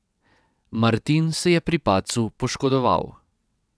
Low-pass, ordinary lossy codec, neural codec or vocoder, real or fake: none; none; vocoder, 22.05 kHz, 80 mel bands, WaveNeXt; fake